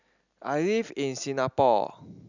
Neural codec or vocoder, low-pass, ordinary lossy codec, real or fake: none; 7.2 kHz; none; real